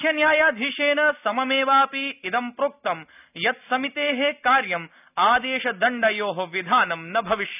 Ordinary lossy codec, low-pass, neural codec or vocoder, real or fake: none; 3.6 kHz; none; real